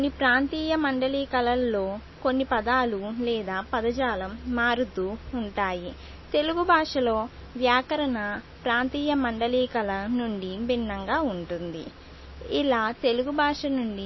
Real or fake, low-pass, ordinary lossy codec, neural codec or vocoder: real; 7.2 kHz; MP3, 24 kbps; none